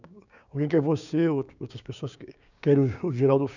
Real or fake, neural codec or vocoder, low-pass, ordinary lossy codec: fake; codec, 16 kHz, 6 kbps, DAC; 7.2 kHz; none